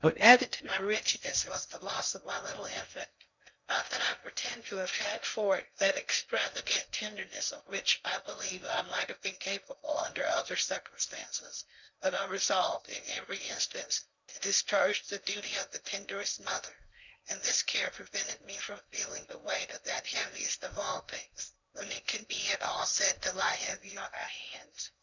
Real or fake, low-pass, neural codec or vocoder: fake; 7.2 kHz; codec, 16 kHz in and 24 kHz out, 0.6 kbps, FocalCodec, streaming, 2048 codes